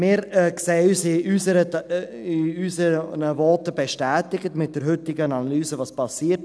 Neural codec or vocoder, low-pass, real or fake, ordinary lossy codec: none; none; real; none